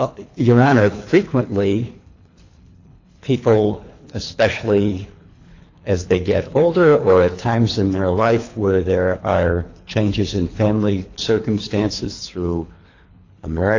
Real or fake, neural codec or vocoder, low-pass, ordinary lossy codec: fake; codec, 24 kHz, 3 kbps, HILCodec; 7.2 kHz; AAC, 48 kbps